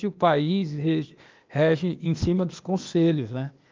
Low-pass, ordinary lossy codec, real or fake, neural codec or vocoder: 7.2 kHz; Opus, 24 kbps; fake; codec, 16 kHz, 0.8 kbps, ZipCodec